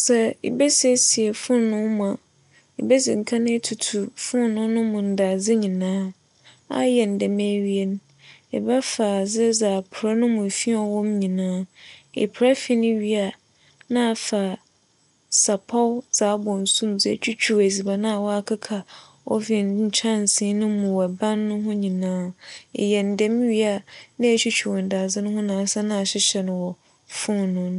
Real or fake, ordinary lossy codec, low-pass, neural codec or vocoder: real; none; 10.8 kHz; none